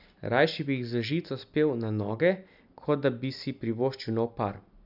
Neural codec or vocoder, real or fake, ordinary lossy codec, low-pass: none; real; none; 5.4 kHz